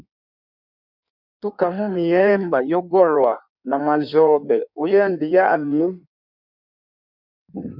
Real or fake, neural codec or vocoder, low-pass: fake; codec, 16 kHz in and 24 kHz out, 1.1 kbps, FireRedTTS-2 codec; 5.4 kHz